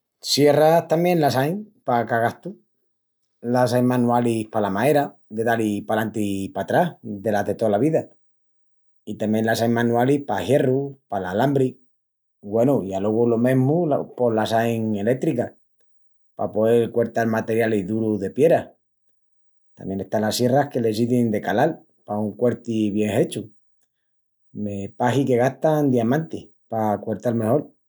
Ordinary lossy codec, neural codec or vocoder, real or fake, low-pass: none; none; real; none